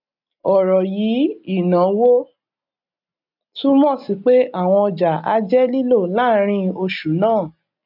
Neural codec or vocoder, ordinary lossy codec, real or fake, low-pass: none; none; real; 5.4 kHz